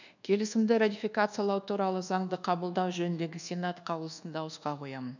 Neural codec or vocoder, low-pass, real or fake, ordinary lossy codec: codec, 24 kHz, 1.2 kbps, DualCodec; 7.2 kHz; fake; none